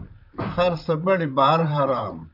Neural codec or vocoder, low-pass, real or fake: vocoder, 44.1 kHz, 128 mel bands, Pupu-Vocoder; 5.4 kHz; fake